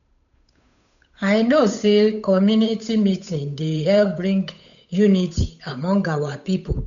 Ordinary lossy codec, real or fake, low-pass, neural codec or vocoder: none; fake; 7.2 kHz; codec, 16 kHz, 8 kbps, FunCodec, trained on Chinese and English, 25 frames a second